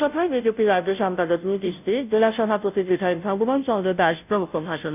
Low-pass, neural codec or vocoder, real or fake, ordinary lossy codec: 3.6 kHz; codec, 16 kHz, 0.5 kbps, FunCodec, trained on Chinese and English, 25 frames a second; fake; none